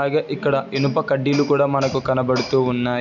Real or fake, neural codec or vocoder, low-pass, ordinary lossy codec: real; none; 7.2 kHz; none